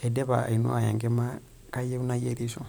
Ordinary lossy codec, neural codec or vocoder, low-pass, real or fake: none; vocoder, 44.1 kHz, 128 mel bands every 512 samples, BigVGAN v2; none; fake